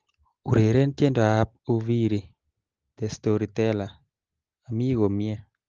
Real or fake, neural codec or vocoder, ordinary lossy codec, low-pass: real; none; Opus, 16 kbps; 7.2 kHz